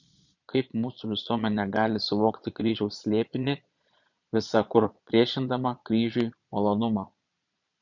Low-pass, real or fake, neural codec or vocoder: 7.2 kHz; fake; vocoder, 22.05 kHz, 80 mel bands, Vocos